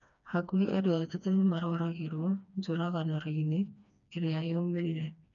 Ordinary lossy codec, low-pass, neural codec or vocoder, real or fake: none; 7.2 kHz; codec, 16 kHz, 2 kbps, FreqCodec, smaller model; fake